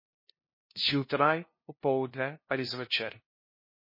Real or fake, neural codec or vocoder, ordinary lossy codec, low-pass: fake; codec, 16 kHz, 0.5 kbps, FunCodec, trained on LibriTTS, 25 frames a second; MP3, 24 kbps; 5.4 kHz